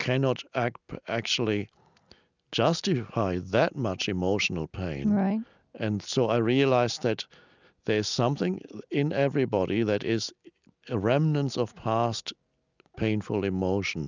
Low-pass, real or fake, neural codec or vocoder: 7.2 kHz; real; none